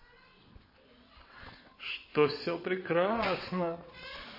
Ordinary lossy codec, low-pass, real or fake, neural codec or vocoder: MP3, 24 kbps; 5.4 kHz; fake; vocoder, 22.05 kHz, 80 mel bands, WaveNeXt